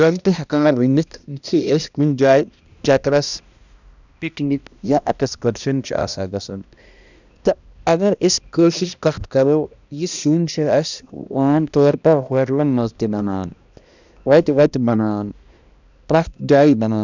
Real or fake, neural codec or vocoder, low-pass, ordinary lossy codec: fake; codec, 16 kHz, 1 kbps, X-Codec, HuBERT features, trained on balanced general audio; 7.2 kHz; none